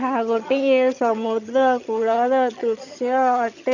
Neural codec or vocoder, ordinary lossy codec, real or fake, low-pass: vocoder, 22.05 kHz, 80 mel bands, HiFi-GAN; none; fake; 7.2 kHz